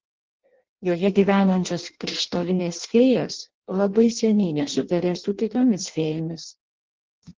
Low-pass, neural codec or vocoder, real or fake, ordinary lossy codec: 7.2 kHz; codec, 16 kHz in and 24 kHz out, 0.6 kbps, FireRedTTS-2 codec; fake; Opus, 16 kbps